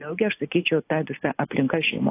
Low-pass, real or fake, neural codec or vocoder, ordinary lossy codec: 3.6 kHz; real; none; AAC, 16 kbps